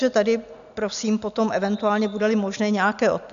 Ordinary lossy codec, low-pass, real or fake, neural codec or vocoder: AAC, 96 kbps; 7.2 kHz; real; none